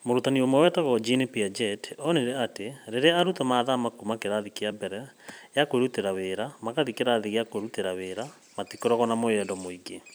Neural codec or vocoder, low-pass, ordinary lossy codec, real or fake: vocoder, 44.1 kHz, 128 mel bands every 256 samples, BigVGAN v2; none; none; fake